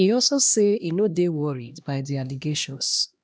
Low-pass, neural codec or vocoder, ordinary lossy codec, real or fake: none; codec, 16 kHz, 1 kbps, X-Codec, HuBERT features, trained on LibriSpeech; none; fake